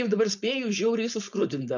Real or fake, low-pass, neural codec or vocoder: fake; 7.2 kHz; codec, 16 kHz, 4.8 kbps, FACodec